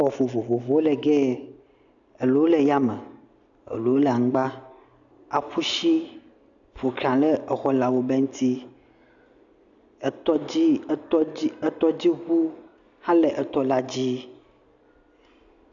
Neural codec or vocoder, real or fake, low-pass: none; real; 7.2 kHz